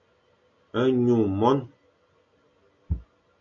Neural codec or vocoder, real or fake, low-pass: none; real; 7.2 kHz